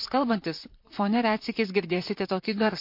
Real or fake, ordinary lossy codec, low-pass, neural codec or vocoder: fake; MP3, 32 kbps; 5.4 kHz; vocoder, 44.1 kHz, 128 mel bands, Pupu-Vocoder